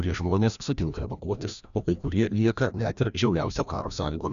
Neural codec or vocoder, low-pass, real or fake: codec, 16 kHz, 1 kbps, FreqCodec, larger model; 7.2 kHz; fake